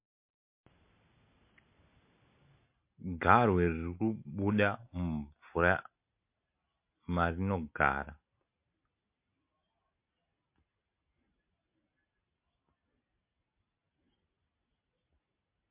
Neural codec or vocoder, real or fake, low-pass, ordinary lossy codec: none; real; 3.6 kHz; MP3, 32 kbps